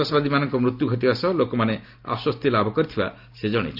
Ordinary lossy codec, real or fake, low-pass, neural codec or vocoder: none; real; 5.4 kHz; none